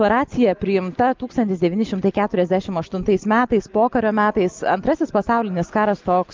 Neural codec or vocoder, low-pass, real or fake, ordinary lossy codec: none; 7.2 kHz; real; Opus, 24 kbps